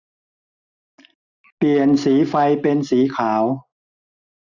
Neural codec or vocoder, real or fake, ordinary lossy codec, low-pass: none; real; none; 7.2 kHz